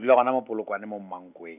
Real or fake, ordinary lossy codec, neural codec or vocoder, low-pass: real; none; none; 3.6 kHz